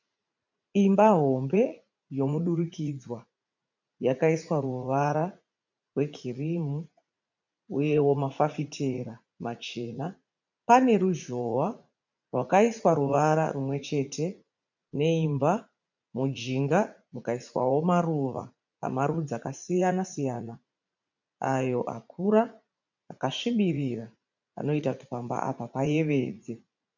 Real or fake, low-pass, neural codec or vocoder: fake; 7.2 kHz; vocoder, 44.1 kHz, 80 mel bands, Vocos